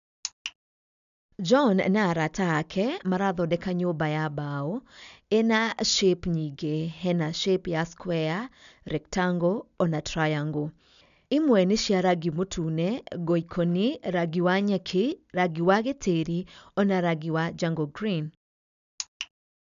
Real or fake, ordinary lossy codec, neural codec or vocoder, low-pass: real; none; none; 7.2 kHz